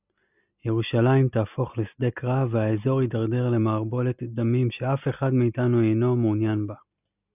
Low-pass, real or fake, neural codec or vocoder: 3.6 kHz; real; none